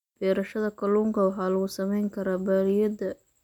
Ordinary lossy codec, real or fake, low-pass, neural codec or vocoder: none; real; 19.8 kHz; none